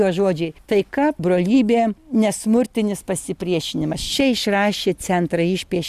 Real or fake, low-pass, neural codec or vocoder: real; 14.4 kHz; none